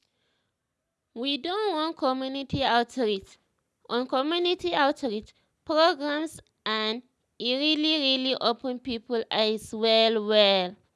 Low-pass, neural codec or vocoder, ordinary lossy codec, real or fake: none; none; none; real